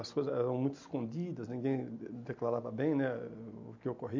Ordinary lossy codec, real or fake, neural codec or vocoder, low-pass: MP3, 48 kbps; real; none; 7.2 kHz